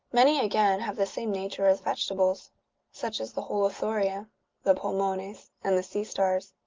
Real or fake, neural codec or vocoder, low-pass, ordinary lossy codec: real; none; 7.2 kHz; Opus, 32 kbps